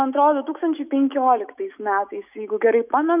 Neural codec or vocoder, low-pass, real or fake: autoencoder, 48 kHz, 128 numbers a frame, DAC-VAE, trained on Japanese speech; 3.6 kHz; fake